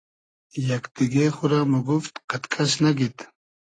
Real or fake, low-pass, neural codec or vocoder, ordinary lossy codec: real; 9.9 kHz; none; AAC, 32 kbps